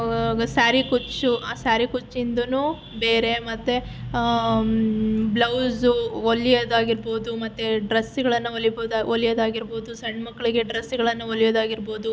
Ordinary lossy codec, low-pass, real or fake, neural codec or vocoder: none; none; real; none